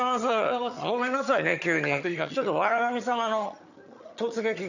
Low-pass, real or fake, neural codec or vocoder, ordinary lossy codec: 7.2 kHz; fake; vocoder, 22.05 kHz, 80 mel bands, HiFi-GAN; none